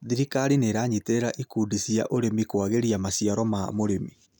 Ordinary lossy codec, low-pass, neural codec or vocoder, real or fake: none; none; none; real